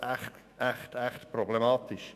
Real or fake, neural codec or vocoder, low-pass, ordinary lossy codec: fake; autoencoder, 48 kHz, 128 numbers a frame, DAC-VAE, trained on Japanese speech; 14.4 kHz; none